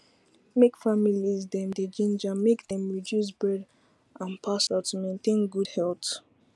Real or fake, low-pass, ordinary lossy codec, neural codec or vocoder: real; none; none; none